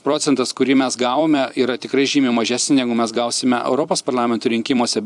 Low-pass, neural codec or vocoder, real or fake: 10.8 kHz; vocoder, 44.1 kHz, 128 mel bands every 256 samples, BigVGAN v2; fake